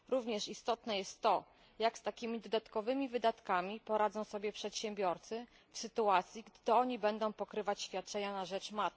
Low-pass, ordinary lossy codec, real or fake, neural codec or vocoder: none; none; real; none